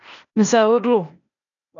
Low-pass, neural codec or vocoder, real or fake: 7.2 kHz; codec, 16 kHz, 0.8 kbps, ZipCodec; fake